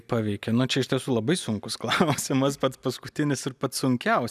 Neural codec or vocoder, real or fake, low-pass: none; real; 14.4 kHz